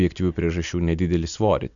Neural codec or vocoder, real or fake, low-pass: none; real; 7.2 kHz